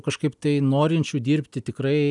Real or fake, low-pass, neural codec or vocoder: real; 10.8 kHz; none